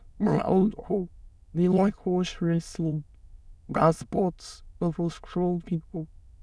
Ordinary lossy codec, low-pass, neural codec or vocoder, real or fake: none; none; autoencoder, 22.05 kHz, a latent of 192 numbers a frame, VITS, trained on many speakers; fake